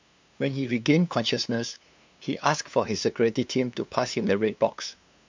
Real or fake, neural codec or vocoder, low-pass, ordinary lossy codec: fake; codec, 16 kHz, 2 kbps, FunCodec, trained on LibriTTS, 25 frames a second; 7.2 kHz; MP3, 64 kbps